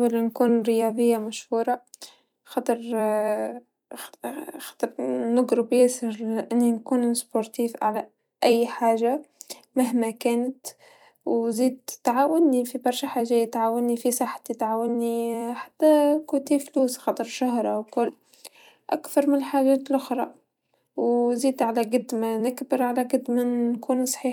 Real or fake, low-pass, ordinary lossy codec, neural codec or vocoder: fake; 19.8 kHz; none; vocoder, 44.1 kHz, 128 mel bands every 256 samples, BigVGAN v2